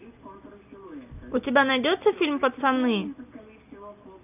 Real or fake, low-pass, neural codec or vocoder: real; 3.6 kHz; none